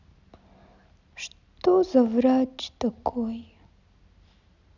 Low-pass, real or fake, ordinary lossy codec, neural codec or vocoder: 7.2 kHz; real; none; none